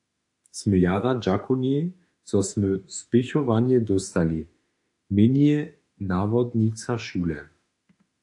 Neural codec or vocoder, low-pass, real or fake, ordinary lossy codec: autoencoder, 48 kHz, 32 numbers a frame, DAC-VAE, trained on Japanese speech; 10.8 kHz; fake; AAC, 64 kbps